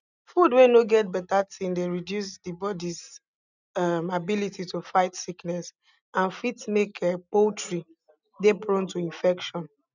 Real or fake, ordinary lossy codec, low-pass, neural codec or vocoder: real; none; 7.2 kHz; none